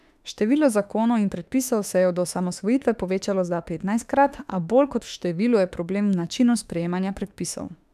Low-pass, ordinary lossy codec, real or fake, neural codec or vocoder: 14.4 kHz; none; fake; autoencoder, 48 kHz, 32 numbers a frame, DAC-VAE, trained on Japanese speech